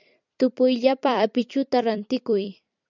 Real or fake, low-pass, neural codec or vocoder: fake; 7.2 kHz; vocoder, 44.1 kHz, 128 mel bands every 256 samples, BigVGAN v2